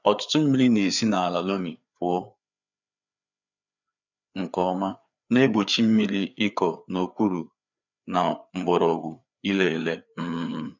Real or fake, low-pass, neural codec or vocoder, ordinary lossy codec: fake; 7.2 kHz; codec, 16 kHz, 4 kbps, FreqCodec, larger model; none